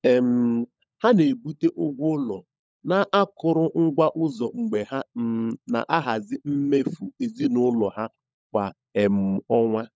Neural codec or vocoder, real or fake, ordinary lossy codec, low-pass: codec, 16 kHz, 16 kbps, FunCodec, trained on LibriTTS, 50 frames a second; fake; none; none